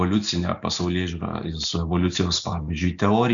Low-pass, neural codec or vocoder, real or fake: 7.2 kHz; none; real